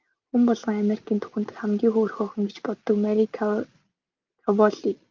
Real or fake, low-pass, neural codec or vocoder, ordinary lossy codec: real; 7.2 kHz; none; Opus, 24 kbps